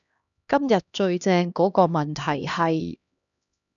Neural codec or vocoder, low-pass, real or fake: codec, 16 kHz, 1 kbps, X-Codec, HuBERT features, trained on LibriSpeech; 7.2 kHz; fake